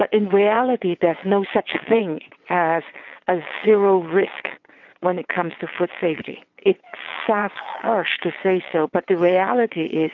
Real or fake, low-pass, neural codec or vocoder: fake; 7.2 kHz; vocoder, 22.05 kHz, 80 mel bands, WaveNeXt